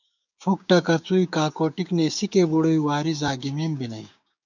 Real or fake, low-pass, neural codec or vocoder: fake; 7.2 kHz; codec, 16 kHz, 6 kbps, DAC